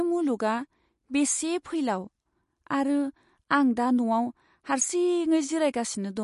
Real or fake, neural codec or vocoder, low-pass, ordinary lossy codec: real; none; 14.4 kHz; MP3, 48 kbps